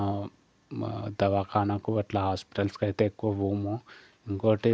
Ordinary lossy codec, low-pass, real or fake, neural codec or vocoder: none; none; real; none